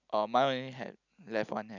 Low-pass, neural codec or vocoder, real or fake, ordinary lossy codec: 7.2 kHz; none; real; none